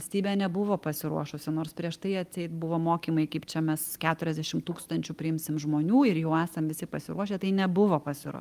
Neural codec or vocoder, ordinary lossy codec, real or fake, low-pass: none; Opus, 32 kbps; real; 14.4 kHz